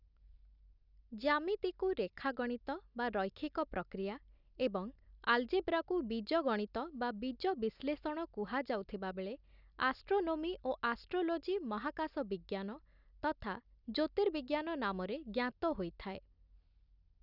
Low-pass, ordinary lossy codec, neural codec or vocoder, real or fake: 5.4 kHz; none; none; real